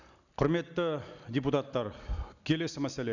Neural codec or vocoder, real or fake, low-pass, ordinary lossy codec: none; real; 7.2 kHz; none